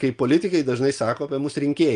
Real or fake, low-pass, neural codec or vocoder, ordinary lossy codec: real; 9.9 kHz; none; Opus, 32 kbps